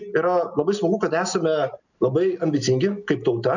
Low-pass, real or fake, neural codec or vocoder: 7.2 kHz; real; none